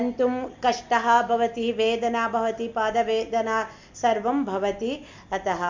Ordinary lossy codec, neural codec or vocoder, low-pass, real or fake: MP3, 64 kbps; none; 7.2 kHz; real